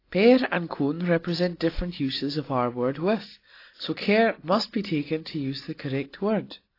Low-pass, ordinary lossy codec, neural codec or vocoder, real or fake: 5.4 kHz; AAC, 32 kbps; none; real